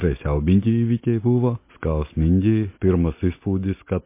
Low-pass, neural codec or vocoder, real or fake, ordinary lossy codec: 3.6 kHz; none; real; MP3, 24 kbps